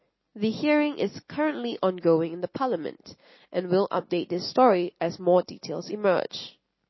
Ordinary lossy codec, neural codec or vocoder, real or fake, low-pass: MP3, 24 kbps; none; real; 7.2 kHz